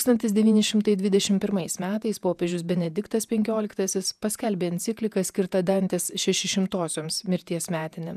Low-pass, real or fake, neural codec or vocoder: 14.4 kHz; fake; vocoder, 48 kHz, 128 mel bands, Vocos